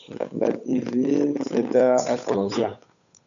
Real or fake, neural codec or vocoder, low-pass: fake; codec, 16 kHz, 8 kbps, FunCodec, trained on Chinese and English, 25 frames a second; 7.2 kHz